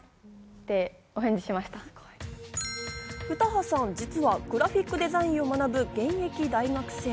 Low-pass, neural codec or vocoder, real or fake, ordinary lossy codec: none; none; real; none